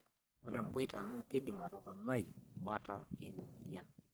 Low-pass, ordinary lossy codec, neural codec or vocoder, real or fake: none; none; codec, 44.1 kHz, 1.7 kbps, Pupu-Codec; fake